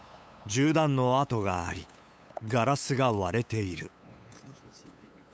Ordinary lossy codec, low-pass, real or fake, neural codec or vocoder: none; none; fake; codec, 16 kHz, 8 kbps, FunCodec, trained on LibriTTS, 25 frames a second